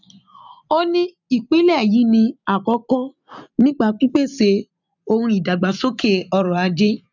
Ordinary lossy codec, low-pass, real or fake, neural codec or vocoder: none; 7.2 kHz; real; none